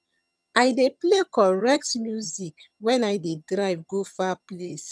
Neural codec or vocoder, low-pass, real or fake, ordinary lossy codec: vocoder, 22.05 kHz, 80 mel bands, HiFi-GAN; none; fake; none